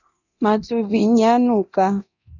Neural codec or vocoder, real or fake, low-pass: codec, 24 kHz, 0.9 kbps, DualCodec; fake; 7.2 kHz